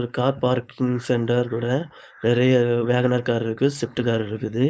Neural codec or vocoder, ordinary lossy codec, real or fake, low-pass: codec, 16 kHz, 4.8 kbps, FACodec; none; fake; none